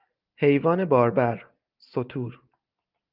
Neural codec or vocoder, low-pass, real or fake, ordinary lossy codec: none; 5.4 kHz; real; Opus, 32 kbps